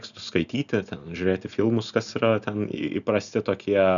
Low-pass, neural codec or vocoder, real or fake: 7.2 kHz; none; real